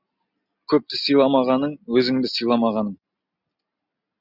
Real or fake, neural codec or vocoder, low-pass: real; none; 5.4 kHz